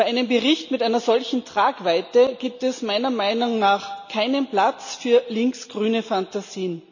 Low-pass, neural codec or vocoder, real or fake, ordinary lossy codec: 7.2 kHz; none; real; none